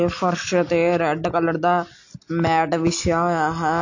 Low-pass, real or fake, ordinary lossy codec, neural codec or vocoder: 7.2 kHz; real; AAC, 48 kbps; none